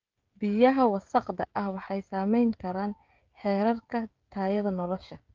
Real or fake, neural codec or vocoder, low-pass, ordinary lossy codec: fake; codec, 16 kHz, 16 kbps, FreqCodec, smaller model; 7.2 kHz; Opus, 24 kbps